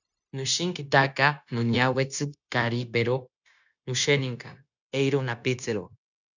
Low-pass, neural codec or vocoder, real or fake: 7.2 kHz; codec, 16 kHz, 0.9 kbps, LongCat-Audio-Codec; fake